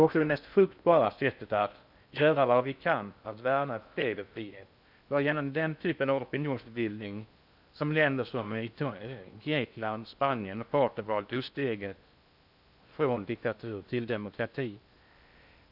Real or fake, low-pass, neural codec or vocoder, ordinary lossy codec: fake; 5.4 kHz; codec, 16 kHz in and 24 kHz out, 0.6 kbps, FocalCodec, streaming, 4096 codes; none